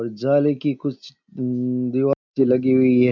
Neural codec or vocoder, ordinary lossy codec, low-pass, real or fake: none; none; 7.2 kHz; real